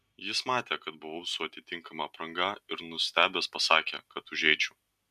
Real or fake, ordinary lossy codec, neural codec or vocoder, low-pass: real; AAC, 96 kbps; none; 14.4 kHz